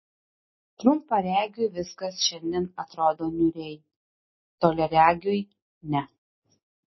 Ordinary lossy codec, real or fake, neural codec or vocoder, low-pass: MP3, 24 kbps; real; none; 7.2 kHz